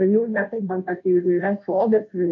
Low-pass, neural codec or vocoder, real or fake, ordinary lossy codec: 7.2 kHz; codec, 16 kHz, 0.5 kbps, FunCodec, trained on Chinese and English, 25 frames a second; fake; AAC, 48 kbps